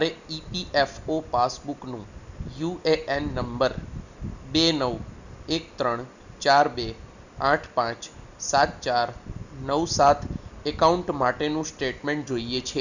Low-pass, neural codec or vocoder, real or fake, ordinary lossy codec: 7.2 kHz; none; real; none